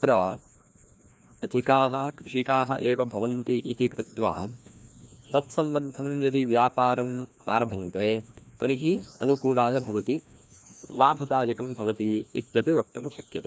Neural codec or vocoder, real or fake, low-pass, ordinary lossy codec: codec, 16 kHz, 1 kbps, FreqCodec, larger model; fake; none; none